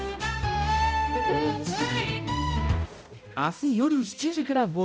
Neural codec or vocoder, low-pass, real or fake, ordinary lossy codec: codec, 16 kHz, 0.5 kbps, X-Codec, HuBERT features, trained on balanced general audio; none; fake; none